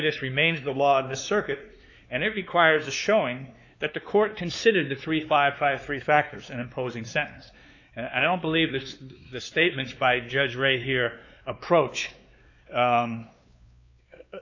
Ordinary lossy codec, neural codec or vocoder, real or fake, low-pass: AAC, 48 kbps; codec, 16 kHz, 2 kbps, X-Codec, WavLM features, trained on Multilingual LibriSpeech; fake; 7.2 kHz